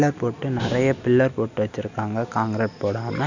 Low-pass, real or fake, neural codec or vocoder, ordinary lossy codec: 7.2 kHz; real; none; none